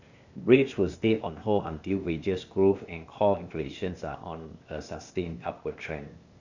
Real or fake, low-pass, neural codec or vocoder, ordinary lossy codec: fake; 7.2 kHz; codec, 16 kHz, 0.8 kbps, ZipCodec; none